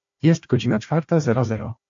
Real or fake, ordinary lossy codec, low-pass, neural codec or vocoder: fake; MP3, 48 kbps; 7.2 kHz; codec, 16 kHz, 1 kbps, FunCodec, trained on Chinese and English, 50 frames a second